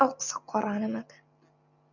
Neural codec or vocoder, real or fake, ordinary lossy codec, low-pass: none; real; MP3, 64 kbps; 7.2 kHz